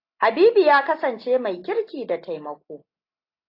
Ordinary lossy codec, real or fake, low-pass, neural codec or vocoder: AAC, 32 kbps; real; 5.4 kHz; none